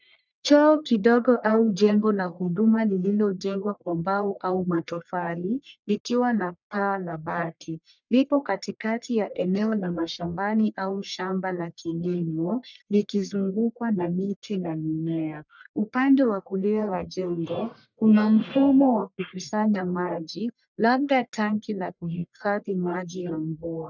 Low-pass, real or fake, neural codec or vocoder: 7.2 kHz; fake; codec, 44.1 kHz, 1.7 kbps, Pupu-Codec